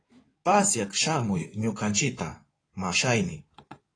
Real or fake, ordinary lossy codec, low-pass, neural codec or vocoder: fake; AAC, 32 kbps; 9.9 kHz; codec, 16 kHz in and 24 kHz out, 2.2 kbps, FireRedTTS-2 codec